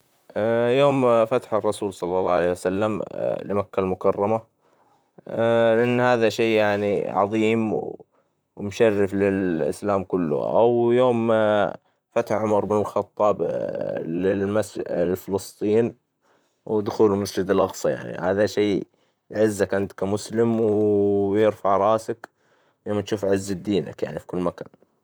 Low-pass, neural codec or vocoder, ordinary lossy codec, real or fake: none; vocoder, 44.1 kHz, 128 mel bands, Pupu-Vocoder; none; fake